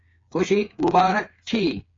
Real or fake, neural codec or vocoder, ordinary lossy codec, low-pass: fake; codec, 16 kHz, 8 kbps, FreqCodec, smaller model; AAC, 32 kbps; 7.2 kHz